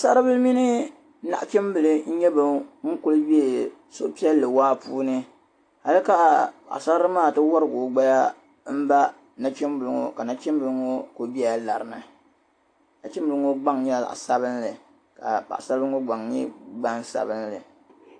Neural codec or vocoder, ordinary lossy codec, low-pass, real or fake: none; AAC, 48 kbps; 9.9 kHz; real